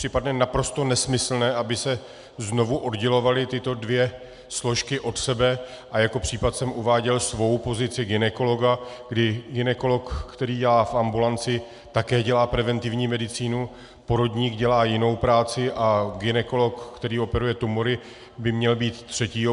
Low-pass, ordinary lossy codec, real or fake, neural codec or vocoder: 10.8 kHz; MP3, 96 kbps; real; none